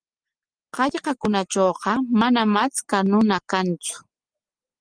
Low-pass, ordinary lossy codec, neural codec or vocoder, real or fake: 9.9 kHz; Opus, 24 kbps; none; real